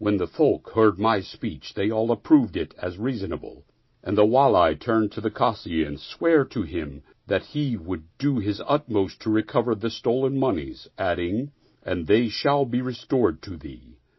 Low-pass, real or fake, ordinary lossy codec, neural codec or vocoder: 7.2 kHz; real; MP3, 24 kbps; none